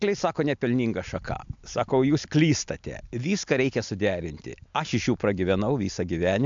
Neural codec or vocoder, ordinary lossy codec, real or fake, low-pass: none; MP3, 64 kbps; real; 7.2 kHz